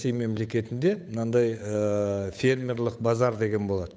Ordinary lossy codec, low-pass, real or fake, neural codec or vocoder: none; none; fake; codec, 16 kHz, 8 kbps, FunCodec, trained on Chinese and English, 25 frames a second